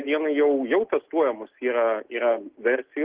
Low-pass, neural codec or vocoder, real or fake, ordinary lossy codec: 3.6 kHz; none; real; Opus, 16 kbps